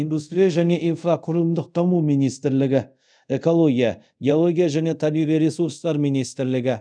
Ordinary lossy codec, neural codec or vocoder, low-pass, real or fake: none; codec, 24 kHz, 0.5 kbps, DualCodec; 9.9 kHz; fake